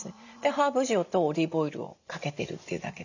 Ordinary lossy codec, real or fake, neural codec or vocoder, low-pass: none; real; none; 7.2 kHz